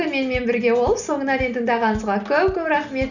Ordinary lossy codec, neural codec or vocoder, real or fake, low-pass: none; none; real; 7.2 kHz